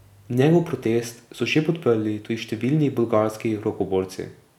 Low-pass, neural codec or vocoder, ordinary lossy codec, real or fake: 19.8 kHz; none; none; real